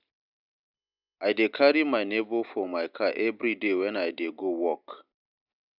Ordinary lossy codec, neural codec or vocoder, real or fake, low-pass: none; none; real; 5.4 kHz